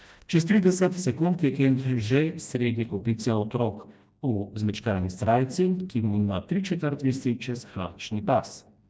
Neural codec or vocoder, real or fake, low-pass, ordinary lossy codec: codec, 16 kHz, 1 kbps, FreqCodec, smaller model; fake; none; none